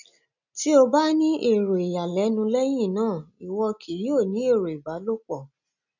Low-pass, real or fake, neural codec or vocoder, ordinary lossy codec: 7.2 kHz; real; none; none